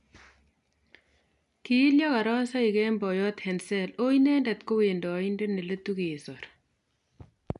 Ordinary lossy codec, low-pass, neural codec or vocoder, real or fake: none; 10.8 kHz; none; real